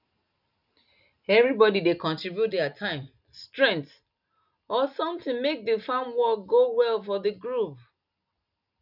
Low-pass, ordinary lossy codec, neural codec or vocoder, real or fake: 5.4 kHz; none; none; real